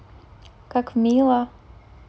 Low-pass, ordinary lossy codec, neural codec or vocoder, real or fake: none; none; none; real